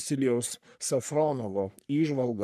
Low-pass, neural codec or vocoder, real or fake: 14.4 kHz; codec, 44.1 kHz, 3.4 kbps, Pupu-Codec; fake